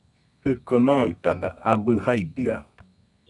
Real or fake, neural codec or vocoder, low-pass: fake; codec, 24 kHz, 0.9 kbps, WavTokenizer, medium music audio release; 10.8 kHz